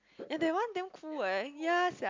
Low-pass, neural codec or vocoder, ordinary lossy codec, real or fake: 7.2 kHz; none; MP3, 64 kbps; real